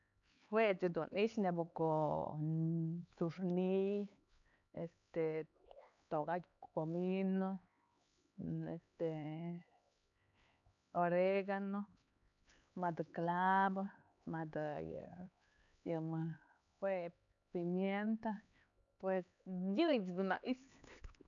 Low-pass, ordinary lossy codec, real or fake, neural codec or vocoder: 7.2 kHz; none; fake; codec, 16 kHz, 4 kbps, X-Codec, HuBERT features, trained on LibriSpeech